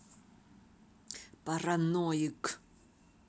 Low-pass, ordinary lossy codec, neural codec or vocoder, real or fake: none; none; none; real